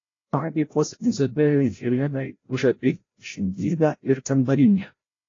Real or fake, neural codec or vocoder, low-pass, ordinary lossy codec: fake; codec, 16 kHz, 0.5 kbps, FreqCodec, larger model; 7.2 kHz; AAC, 32 kbps